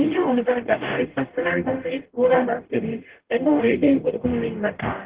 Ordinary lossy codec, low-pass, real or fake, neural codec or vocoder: Opus, 16 kbps; 3.6 kHz; fake; codec, 44.1 kHz, 0.9 kbps, DAC